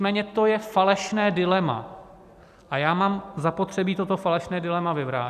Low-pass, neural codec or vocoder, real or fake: 14.4 kHz; none; real